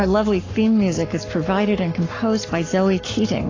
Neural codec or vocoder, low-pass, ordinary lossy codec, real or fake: codec, 44.1 kHz, 7.8 kbps, Pupu-Codec; 7.2 kHz; AAC, 32 kbps; fake